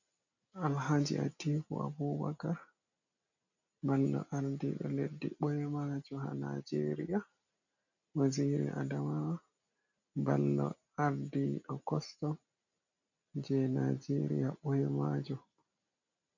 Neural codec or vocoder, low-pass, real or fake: none; 7.2 kHz; real